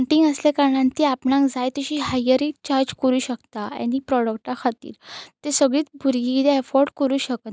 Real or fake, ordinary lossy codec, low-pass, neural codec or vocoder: real; none; none; none